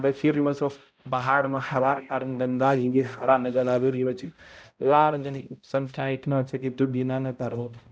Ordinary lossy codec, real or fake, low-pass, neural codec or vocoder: none; fake; none; codec, 16 kHz, 0.5 kbps, X-Codec, HuBERT features, trained on balanced general audio